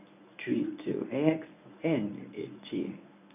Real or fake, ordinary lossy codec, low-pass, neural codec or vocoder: fake; none; 3.6 kHz; codec, 24 kHz, 0.9 kbps, WavTokenizer, medium speech release version 1